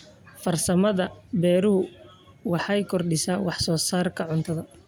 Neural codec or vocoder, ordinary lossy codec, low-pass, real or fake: none; none; none; real